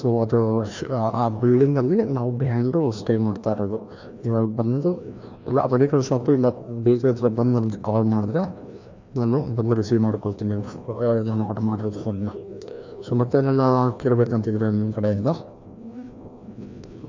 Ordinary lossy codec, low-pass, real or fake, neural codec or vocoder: MP3, 64 kbps; 7.2 kHz; fake; codec, 16 kHz, 1 kbps, FreqCodec, larger model